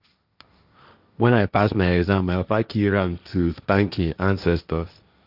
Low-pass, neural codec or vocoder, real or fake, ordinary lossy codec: 5.4 kHz; codec, 16 kHz, 1.1 kbps, Voila-Tokenizer; fake; none